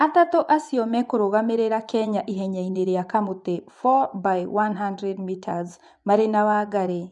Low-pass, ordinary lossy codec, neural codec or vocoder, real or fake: 10.8 kHz; none; vocoder, 24 kHz, 100 mel bands, Vocos; fake